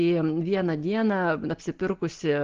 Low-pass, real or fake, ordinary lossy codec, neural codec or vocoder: 7.2 kHz; real; Opus, 16 kbps; none